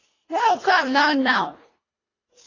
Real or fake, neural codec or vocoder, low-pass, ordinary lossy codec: fake; codec, 24 kHz, 1.5 kbps, HILCodec; 7.2 kHz; AAC, 32 kbps